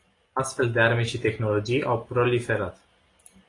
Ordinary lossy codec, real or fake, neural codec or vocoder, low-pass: AAC, 32 kbps; real; none; 10.8 kHz